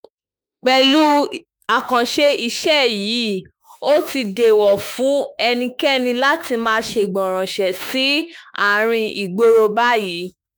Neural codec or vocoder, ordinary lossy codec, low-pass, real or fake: autoencoder, 48 kHz, 32 numbers a frame, DAC-VAE, trained on Japanese speech; none; none; fake